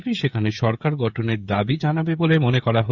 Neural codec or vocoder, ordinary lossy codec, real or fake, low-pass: codec, 16 kHz, 16 kbps, FreqCodec, smaller model; none; fake; 7.2 kHz